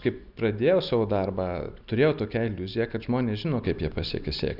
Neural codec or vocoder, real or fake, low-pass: none; real; 5.4 kHz